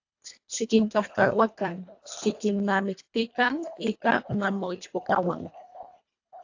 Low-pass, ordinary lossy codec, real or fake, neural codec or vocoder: 7.2 kHz; AAC, 48 kbps; fake; codec, 24 kHz, 1.5 kbps, HILCodec